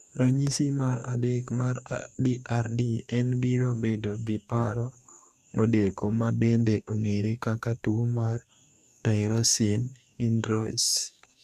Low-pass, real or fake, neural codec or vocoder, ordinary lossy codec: 14.4 kHz; fake; codec, 44.1 kHz, 2.6 kbps, DAC; none